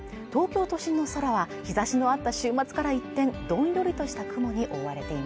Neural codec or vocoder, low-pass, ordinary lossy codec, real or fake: none; none; none; real